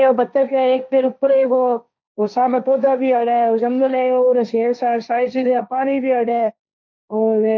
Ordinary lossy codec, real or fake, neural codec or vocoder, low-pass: none; fake; codec, 16 kHz, 1.1 kbps, Voila-Tokenizer; none